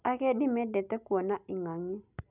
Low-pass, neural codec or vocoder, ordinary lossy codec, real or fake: 3.6 kHz; none; none; real